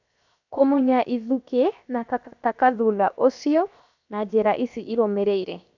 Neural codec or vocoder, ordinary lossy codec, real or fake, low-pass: codec, 16 kHz, 0.7 kbps, FocalCodec; none; fake; 7.2 kHz